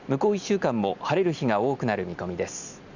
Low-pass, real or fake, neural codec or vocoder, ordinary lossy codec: 7.2 kHz; real; none; Opus, 64 kbps